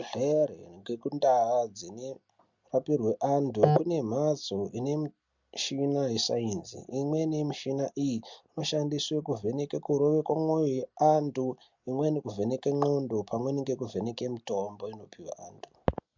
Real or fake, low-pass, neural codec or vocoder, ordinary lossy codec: real; 7.2 kHz; none; MP3, 64 kbps